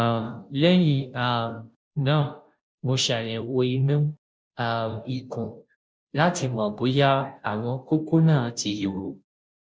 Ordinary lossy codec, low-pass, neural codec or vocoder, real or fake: none; none; codec, 16 kHz, 0.5 kbps, FunCodec, trained on Chinese and English, 25 frames a second; fake